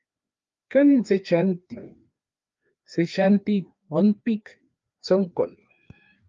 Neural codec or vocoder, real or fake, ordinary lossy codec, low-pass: codec, 16 kHz, 2 kbps, FreqCodec, larger model; fake; Opus, 32 kbps; 7.2 kHz